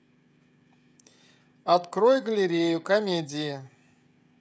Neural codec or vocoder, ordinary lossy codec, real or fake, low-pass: codec, 16 kHz, 16 kbps, FreqCodec, smaller model; none; fake; none